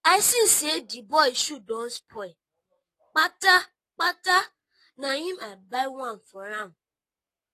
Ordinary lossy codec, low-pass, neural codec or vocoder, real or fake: AAC, 48 kbps; 14.4 kHz; codec, 44.1 kHz, 7.8 kbps, Pupu-Codec; fake